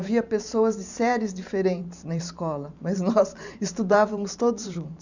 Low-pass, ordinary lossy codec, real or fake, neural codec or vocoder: 7.2 kHz; none; fake; vocoder, 44.1 kHz, 128 mel bands every 512 samples, BigVGAN v2